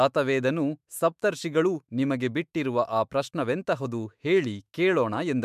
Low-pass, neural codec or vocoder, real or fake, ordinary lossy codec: 14.4 kHz; autoencoder, 48 kHz, 128 numbers a frame, DAC-VAE, trained on Japanese speech; fake; MP3, 96 kbps